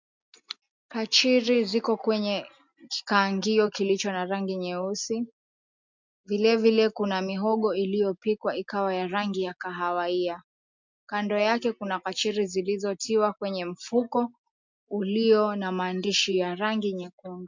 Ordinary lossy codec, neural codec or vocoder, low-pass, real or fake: MP3, 64 kbps; none; 7.2 kHz; real